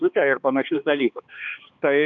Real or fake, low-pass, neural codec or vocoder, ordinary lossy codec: fake; 7.2 kHz; codec, 16 kHz, 2 kbps, X-Codec, HuBERT features, trained on balanced general audio; MP3, 64 kbps